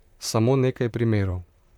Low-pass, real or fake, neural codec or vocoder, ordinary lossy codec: 19.8 kHz; fake; vocoder, 44.1 kHz, 128 mel bands, Pupu-Vocoder; none